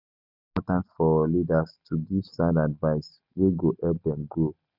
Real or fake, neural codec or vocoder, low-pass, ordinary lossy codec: real; none; 5.4 kHz; none